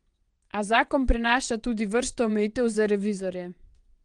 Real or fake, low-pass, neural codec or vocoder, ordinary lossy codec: fake; 9.9 kHz; vocoder, 22.05 kHz, 80 mel bands, WaveNeXt; Opus, 24 kbps